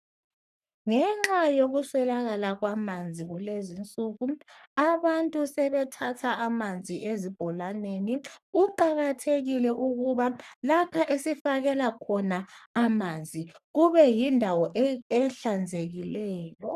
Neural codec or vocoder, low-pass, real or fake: codec, 44.1 kHz, 3.4 kbps, Pupu-Codec; 14.4 kHz; fake